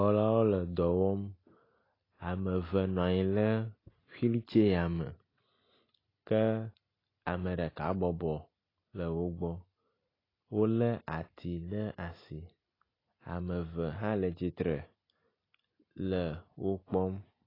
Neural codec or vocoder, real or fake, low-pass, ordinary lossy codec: none; real; 5.4 kHz; AAC, 24 kbps